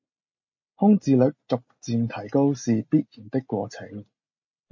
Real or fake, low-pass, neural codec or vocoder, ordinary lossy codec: real; 7.2 kHz; none; MP3, 32 kbps